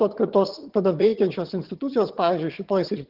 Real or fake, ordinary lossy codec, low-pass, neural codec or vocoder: fake; Opus, 16 kbps; 5.4 kHz; vocoder, 22.05 kHz, 80 mel bands, HiFi-GAN